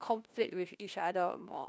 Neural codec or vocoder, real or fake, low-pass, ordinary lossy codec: codec, 16 kHz, 1 kbps, FunCodec, trained on LibriTTS, 50 frames a second; fake; none; none